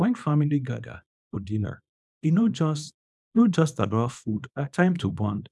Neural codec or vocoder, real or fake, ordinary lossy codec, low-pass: codec, 24 kHz, 0.9 kbps, WavTokenizer, small release; fake; none; none